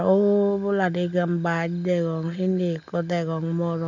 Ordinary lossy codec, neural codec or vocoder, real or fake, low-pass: none; none; real; 7.2 kHz